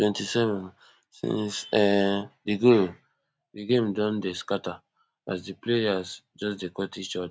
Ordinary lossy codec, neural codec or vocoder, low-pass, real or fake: none; none; none; real